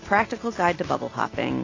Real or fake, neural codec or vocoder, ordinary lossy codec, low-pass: real; none; AAC, 32 kbps; 7.2 kHz